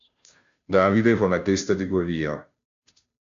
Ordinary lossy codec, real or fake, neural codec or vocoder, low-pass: MP3, 64 kbps; fake; codec, 16 kHz, 0.5 kbps, FunCodec, trained on Chinese and English, 25 frames a second; 7.2 kHz